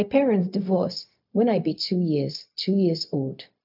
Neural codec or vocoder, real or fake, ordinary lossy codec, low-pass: codec, 16 kHz, 0.4 kbps, LongCat-Audio-Codec; fake; none; 5.4 kHz